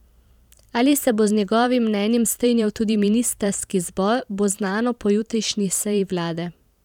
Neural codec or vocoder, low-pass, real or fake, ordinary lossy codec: vocoder, 44.1 kHz, 128 mel bands every 512 samples, BigVGAN v2; 19.8 kHz; fake; none